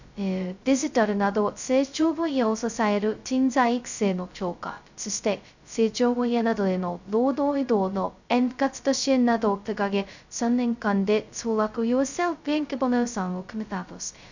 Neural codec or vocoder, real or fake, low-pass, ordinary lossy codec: codec, 16 kHz, 0.2 kbps, FocalCodec; fake; 7.2 kHz; none